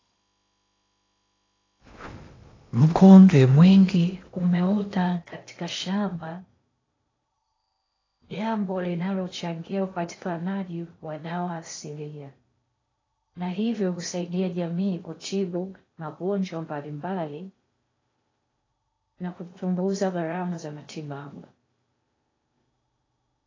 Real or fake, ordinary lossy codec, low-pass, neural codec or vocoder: fake; AAC, 32 kbps; 7.2 kHz; codec, 16 kHz in and 24 kHz out, 0.8 kbps, FocalCodec, streaming, 65536 codes